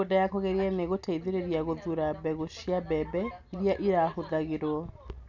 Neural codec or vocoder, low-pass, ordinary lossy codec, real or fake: none; 7.2 kHz; none; real